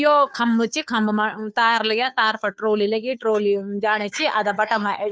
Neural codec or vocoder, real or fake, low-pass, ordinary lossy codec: codec, 16 kHz, 2 kbps, FunCodec, trained on Chinese and English, 25 frames a second; fake; none; none